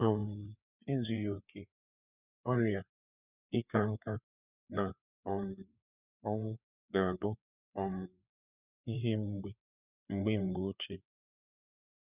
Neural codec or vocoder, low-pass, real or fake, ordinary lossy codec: codec, 16 kHz, 4 kbps, FreqCodec, larger model; 3.6 kHz; fake; none